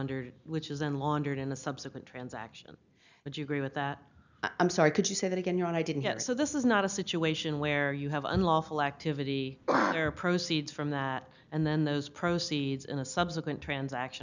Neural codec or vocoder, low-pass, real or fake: none; 7.2 kHz; real